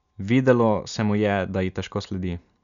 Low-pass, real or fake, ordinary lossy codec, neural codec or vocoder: 7.2 kHz; real; none; none